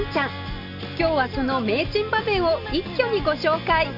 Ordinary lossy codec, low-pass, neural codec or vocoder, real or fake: none; 5.4 kHz; none; real